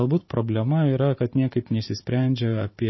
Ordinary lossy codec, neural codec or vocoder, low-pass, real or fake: MP3, 24 kbps; vocoder, 44.1 kHz, 128 mel bands every 512 samples, BigVGAN v2; 7.2 kHz; fake